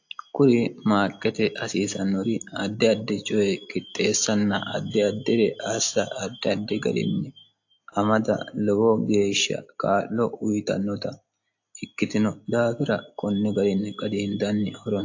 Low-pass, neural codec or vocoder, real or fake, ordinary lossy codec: 7.2 kHz; none; real; AAC, 48 kbps